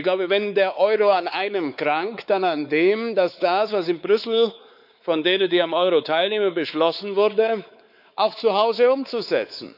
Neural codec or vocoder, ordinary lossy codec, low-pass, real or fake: codec, 16 kHz, 4 kbps, X-Codec, WavLM features, trained on Multilingual LibriSpeech; none; 5.4 kHz; fake